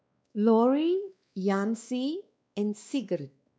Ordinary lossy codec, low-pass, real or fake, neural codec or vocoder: none; none; fake; codec, 16 kHz, 2 kbps, X-Codec, WavLM features, trained on Multilingual LibriSpeech